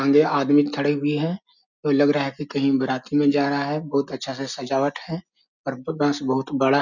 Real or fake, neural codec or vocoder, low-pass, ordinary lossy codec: real; none; 7.2 kHz; none